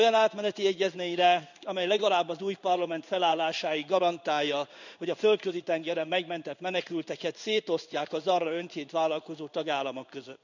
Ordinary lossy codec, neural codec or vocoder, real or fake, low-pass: none; codec, 16 kHz in and 24 kHz out, 1 kbps, XY-Tokenizer; fake; 7.2 kHz